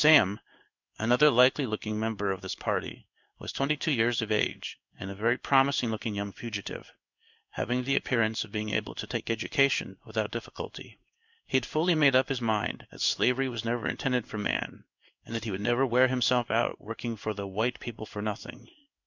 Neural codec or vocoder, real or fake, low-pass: codec, 16 kHz in and 24 kHz out, 1 kbps, XY-Tokenizer; fake; 7.2 kHz